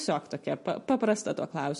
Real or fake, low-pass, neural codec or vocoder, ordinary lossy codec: real; 14.4 kHz; none; MP3, 48 kbps